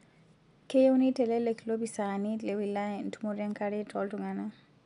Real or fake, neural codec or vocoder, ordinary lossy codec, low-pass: real; none; none; 10.8 kHz